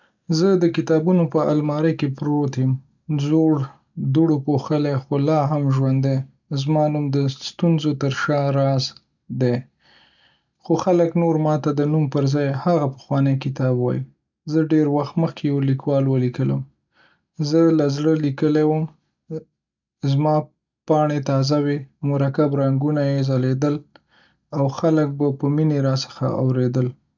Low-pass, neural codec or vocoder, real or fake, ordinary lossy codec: 7.2 kHz; none; real; none